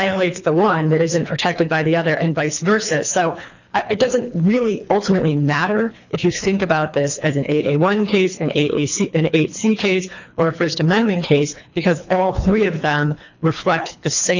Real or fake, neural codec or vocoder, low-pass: fake; codec, 24 kHz, 3 kbps, HILCodec; 7.2 kHz